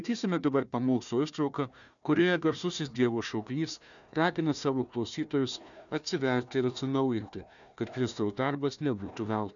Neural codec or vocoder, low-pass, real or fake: codec, 16 kHz, 1 kbps, FunCodec, trained on Chinese and English, 50 frames a second; 7.2 kHz; fake